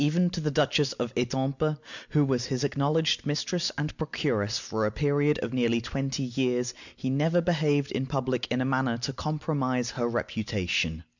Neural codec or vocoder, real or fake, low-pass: none; real; 7.2 kHz